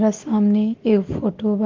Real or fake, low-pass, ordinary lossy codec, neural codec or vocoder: real; 7.2 kHz; Opus, 16 kbps; none